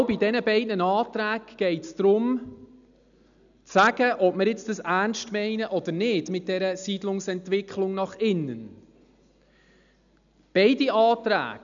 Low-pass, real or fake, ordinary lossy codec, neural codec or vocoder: 7.2 kHz; real; none; none